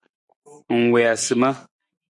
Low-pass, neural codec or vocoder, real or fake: 10.8 kHz; none; real